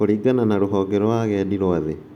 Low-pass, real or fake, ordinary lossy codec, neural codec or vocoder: 19.8 kHz; real; none; none